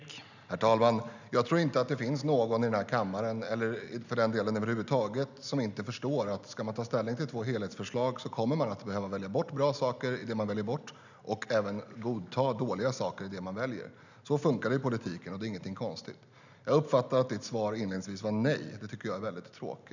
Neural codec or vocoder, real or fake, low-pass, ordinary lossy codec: none; real; 7.2 kHz; none